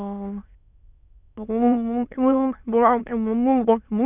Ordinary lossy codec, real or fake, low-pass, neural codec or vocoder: none; fake; 3.6 kHz; autoencoder, 22.05 kHz, a latent of 192 numbers a frame, VITS, trained on many speakers